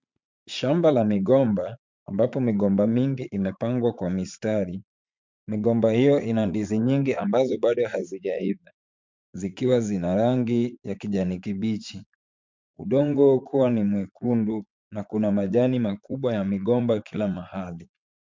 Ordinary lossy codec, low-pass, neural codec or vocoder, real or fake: MP3, 64 kbps; 7.2 kHz; vocoder, 44.1 kHz, 80 mel bands, Vocos; fake